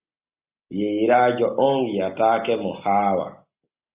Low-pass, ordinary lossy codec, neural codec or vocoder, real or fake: 3.6 kHz; Opus, 64 kbps; none; real